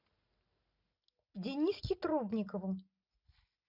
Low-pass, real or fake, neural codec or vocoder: 5.4 kHz; real; none